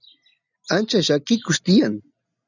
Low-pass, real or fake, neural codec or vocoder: 7.2 kHz; real; none